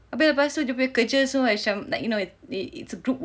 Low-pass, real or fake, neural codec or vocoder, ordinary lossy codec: none; real; none; none